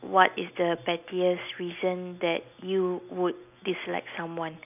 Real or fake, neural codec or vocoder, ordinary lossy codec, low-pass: real; none; none; 3.6 kHz